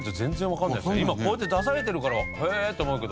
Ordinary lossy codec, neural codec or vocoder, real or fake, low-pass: none; none; real; none